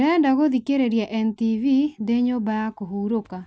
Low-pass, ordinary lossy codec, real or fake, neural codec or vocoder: none; none; real; none